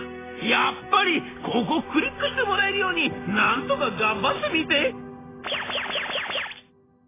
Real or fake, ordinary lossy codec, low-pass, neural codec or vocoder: real; AAC, 16 kbps; 3.6 kHz; none